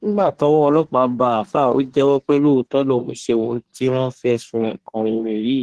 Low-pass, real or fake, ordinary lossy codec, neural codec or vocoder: 10.8 kHz; fake; Opus, 16 kbps; codec, 24 kHz, 1 kbps, SNAC